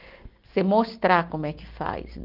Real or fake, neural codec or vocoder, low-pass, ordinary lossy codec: real; none; 5.4 kHz; Opus, 32 kbps